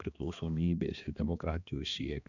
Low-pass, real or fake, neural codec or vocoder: 7.2 kHz; fake; codec, 16 kHz, 2 kbps, X-Codec, HuBERT features, trained on balanced general audio